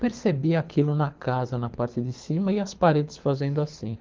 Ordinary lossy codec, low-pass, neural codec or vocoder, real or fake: Opus, 32 kbps; 7.2 kHz; codec, 24 kHz, 6 kbps, HILCodec; fake